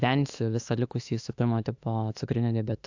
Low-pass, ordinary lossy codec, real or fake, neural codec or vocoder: 7.2 kHz; AAC, 48 kbps; fake; autoencoder, 48 kHz, 32 numbers a frame, DAC-VAE, trained on Japanese speech